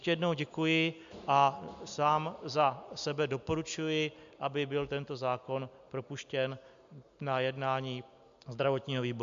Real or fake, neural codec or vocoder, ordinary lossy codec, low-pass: real; none; MP3, 64 kbps; 7.2 kHz